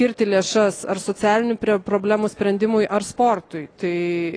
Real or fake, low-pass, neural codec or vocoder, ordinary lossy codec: real; 9.9 kHz; none; AAC, 32 kbps